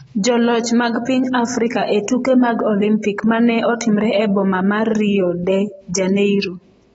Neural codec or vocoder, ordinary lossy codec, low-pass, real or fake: none; AAC, 24 kbps; 19.8 kHz; real